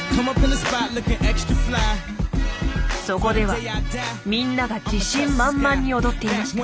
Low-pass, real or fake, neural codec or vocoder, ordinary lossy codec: none; real; none; none